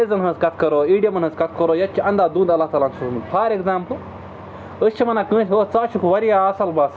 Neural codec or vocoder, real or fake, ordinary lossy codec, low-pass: none; real; none; none